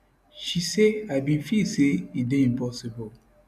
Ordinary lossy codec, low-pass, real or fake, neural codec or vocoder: none; 14.4 kHz; real; none